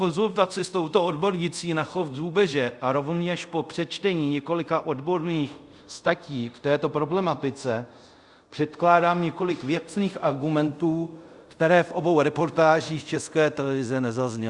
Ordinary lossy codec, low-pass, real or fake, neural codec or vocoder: Opus, 64 kbps; 10.8 kHz; fake; codec, 24 kHz, 0.5 kbps, DualCodec